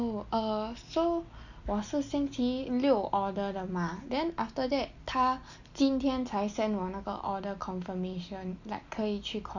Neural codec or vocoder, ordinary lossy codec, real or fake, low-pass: none; none; real; 7.2 kHz